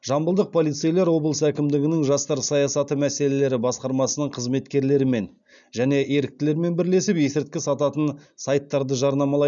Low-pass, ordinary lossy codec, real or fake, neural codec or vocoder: 7.2 kHz; none; real; none